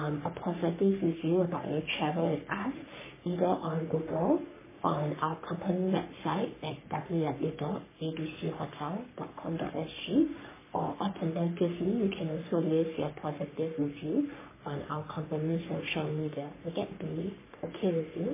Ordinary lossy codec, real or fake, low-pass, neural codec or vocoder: MP3, 16 kbps; fake; 3.6 kHz; codec, 44.1 kHz, 3.4 kbps, Pupu-Codec